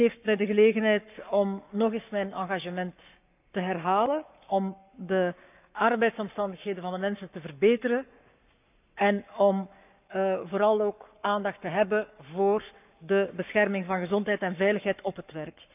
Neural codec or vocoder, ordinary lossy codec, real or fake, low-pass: codec, 44.1 kHz, 7.8 kbps, Pupu-Codec; none; fake; 3.6 kHz